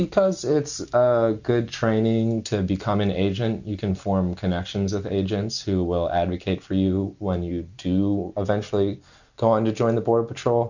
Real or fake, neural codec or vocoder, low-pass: real; none; 7.2 kHz